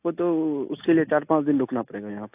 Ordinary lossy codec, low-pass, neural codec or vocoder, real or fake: AAC, 32 kbps; 3.6 kHz; none; real